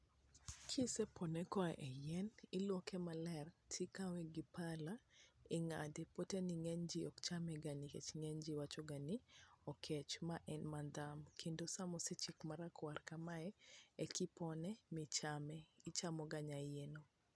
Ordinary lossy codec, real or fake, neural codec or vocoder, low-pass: none; real; none; none